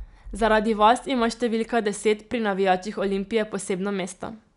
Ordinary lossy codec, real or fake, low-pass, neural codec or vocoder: none; real; 10.8 kHz; none